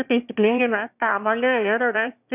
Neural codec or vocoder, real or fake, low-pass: autoencoder, 22.05 kHz, a latent of 192 numbers a frame, VITS, trained on one speaker; fake; 3.6 kHz